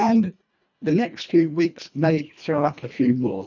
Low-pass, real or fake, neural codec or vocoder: 7.2 kHz; fake; codec, 24 kHz, 1.5 kbps, HILCodec